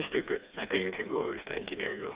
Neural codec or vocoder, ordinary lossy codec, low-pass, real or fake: codec, 16 kHz, 2 kbps, FreqCodec, smaller model; Opus, 64 kbps; 3.6 kHz; fake